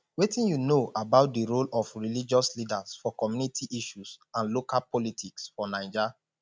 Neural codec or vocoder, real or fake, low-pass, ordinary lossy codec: none; real; none; none